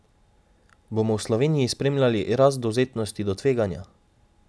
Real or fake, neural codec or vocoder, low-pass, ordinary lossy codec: real; none; none; none